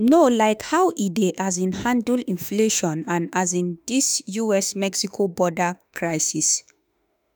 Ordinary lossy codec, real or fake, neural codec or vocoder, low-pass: none; fake; autoencoder, 48 kHz, 32 numbers a frame, DAC-VAE, trained on Japanese speech; none